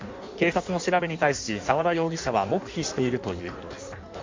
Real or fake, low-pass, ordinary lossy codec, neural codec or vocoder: fake; 7.2 kHz; MP3, 48 kbps; codec, 16 kHz in and 24 kHz out, 1.1 kbps, FireRedTTS-2 codec